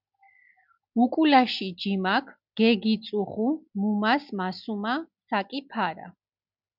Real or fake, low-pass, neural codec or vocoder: real; 5.4 kHz; none